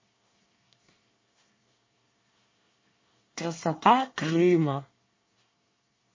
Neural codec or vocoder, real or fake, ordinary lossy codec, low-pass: codec, 24 kHz, 1 kbps, SNAC; fake; MP3, 32 kbps; 7.2 kHz